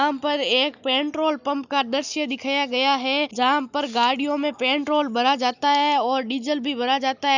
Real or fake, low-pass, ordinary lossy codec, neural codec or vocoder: real; 7.2 kHz; none; none